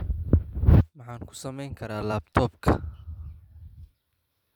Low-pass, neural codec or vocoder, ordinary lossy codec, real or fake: 19.8 kHz; vocoder, 44.1 kHz, 128 mel bands every 256 samples, BigVGAN v2; none; fake